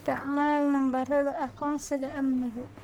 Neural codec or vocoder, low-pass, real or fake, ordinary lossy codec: codec, 44.1 kHz, 1.7 kbps, Pupu-Codec; none; fake; none